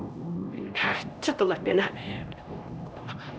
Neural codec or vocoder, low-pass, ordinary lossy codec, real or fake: codec, 16 kHz, 1 kbps, X-Codec, HuBERT features, trained on LibriSpeech; none; none; fake